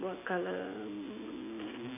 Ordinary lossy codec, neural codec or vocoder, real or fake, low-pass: none; none; real; 3.6 kHz